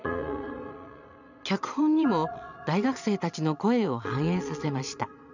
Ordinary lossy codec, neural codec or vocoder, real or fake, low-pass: none; vocoder, 22.05 kHz, 80 mel bands, Vocos; fake; 7.2 kHz